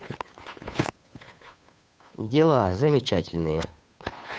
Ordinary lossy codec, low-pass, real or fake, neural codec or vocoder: none; none; fake; codec, 16 kHz, 2 kbps, FunCodec, trained on Chinese and English, 25 frames a second